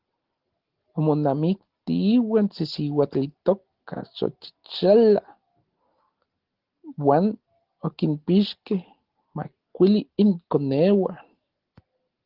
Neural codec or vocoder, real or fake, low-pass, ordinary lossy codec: none; real; 5.4 kHz; Opus, 16 kbps